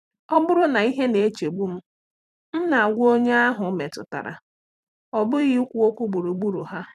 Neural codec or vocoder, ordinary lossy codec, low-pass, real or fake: vocoder, 44.1 kHz, 128 mel bands every 256 samples, BigVGAN v2; none; 14.4 kHz; fake